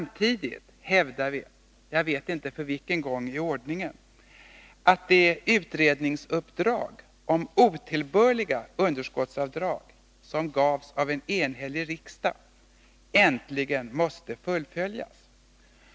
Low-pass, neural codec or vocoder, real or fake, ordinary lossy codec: none; none; real; none